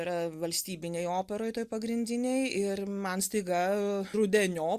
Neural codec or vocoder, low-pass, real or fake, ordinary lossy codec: none; 14.4 kHz; real; Opus, 64 kbps